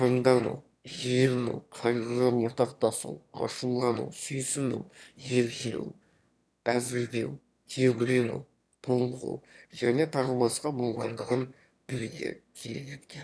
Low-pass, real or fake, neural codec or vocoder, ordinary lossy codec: none; fake; autoencoder, 22.05 kHz, a latent of 192 numbers a frame, VITS, trained on one speaker; none